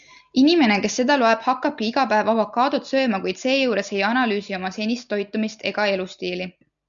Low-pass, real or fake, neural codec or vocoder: 7.2 kHz; real; none